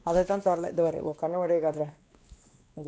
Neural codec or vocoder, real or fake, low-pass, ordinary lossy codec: codec, 16 kHz, 2 kbps, X-Codec, WavLM features, trained on Multilingual LibriSpeech; fake; none; none